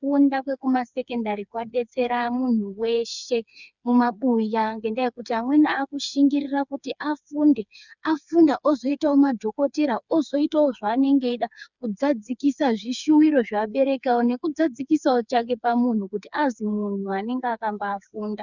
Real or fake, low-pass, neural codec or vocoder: fake; 7.2 kHz; codec, 16 kHz, 4 kbps, FreqCodec, smaller model